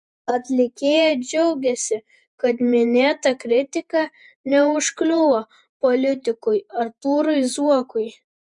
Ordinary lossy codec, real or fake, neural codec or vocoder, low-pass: MP3, 64 kbps; fake; vocoder, 48 kHz, 128 mel bands, Vocos; 10.8 kHz